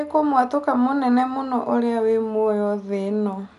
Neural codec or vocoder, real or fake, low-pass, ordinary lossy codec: none; real; 10.8 kHz; none